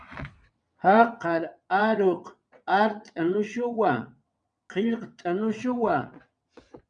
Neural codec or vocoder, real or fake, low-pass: vocoder, 22.05 kHz, 80 mel bands, WaveNeXt; fake; 9.9 kHz